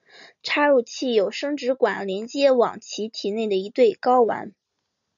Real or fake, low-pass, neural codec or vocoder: real; 7.2 kHz; none